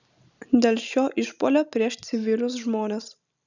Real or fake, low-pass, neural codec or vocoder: real; 7.2 kHz; none